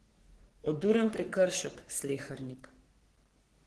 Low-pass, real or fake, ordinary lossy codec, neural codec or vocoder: 10.8 kHz; fake; Opus, 16 kbps; codec, 44.1 kHz, 3.4 kbps, Pupu-Codec